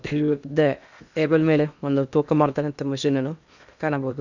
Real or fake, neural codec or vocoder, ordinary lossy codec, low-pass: fake; codec, 16 kHz in and 24 kHz out, 0.8 kbps, FocalCodec, streaming, 65536 codes; none; 7.2 kHz